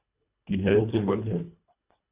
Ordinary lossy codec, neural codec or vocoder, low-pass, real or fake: Opus, 64 kbps; codec, 24 kHz, 1.5 kbps, HILCodec; 3.6 kHz; fake